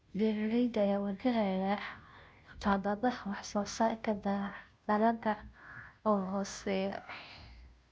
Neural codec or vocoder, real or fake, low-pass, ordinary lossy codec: codec, 16 kHz, 0.5 kbps, FunCodec, trained on Chinese and English, 25 frames a second; fake; none; none